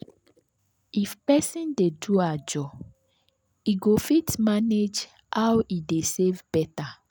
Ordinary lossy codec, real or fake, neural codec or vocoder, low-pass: none; real; none; none